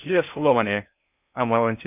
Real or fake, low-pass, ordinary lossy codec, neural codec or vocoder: fake; 3.6 kHz; none; codec, 16 kHz in and 24 kHz out, 0.6 kbps, FocalCodec, streaming, 4096 codes